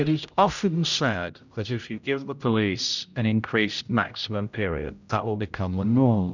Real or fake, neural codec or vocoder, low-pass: fake; codec, 16 kHz, 0.5 kbps, X-Codec, HuBERT features, trained on general audio; 7.2 kHz